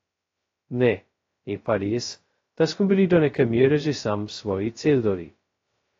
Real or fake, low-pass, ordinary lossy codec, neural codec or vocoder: fake; 7.2 kHz; AAC, 32 kbps; codec, 16 kHz, 0.2 kbps, FocalCodec